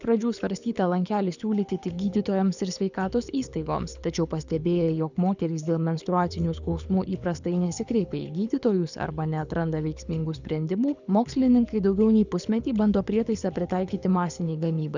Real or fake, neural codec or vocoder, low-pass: fake; codec, 24 kHz, 6 kbps, HILCodec; 7.2 kHz